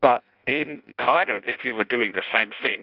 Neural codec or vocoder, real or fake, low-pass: codec, 16 kHz in and 24 kHz out, 0.6 kbps, FireRedTTS-2 codec; fake; 5.4 kHz